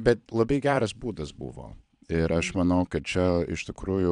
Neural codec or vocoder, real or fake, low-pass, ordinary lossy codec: vocoder, 22.05 kHz, 80 mel bands, Vocos; fake; 9.9 kHz; MP3, 96 kbps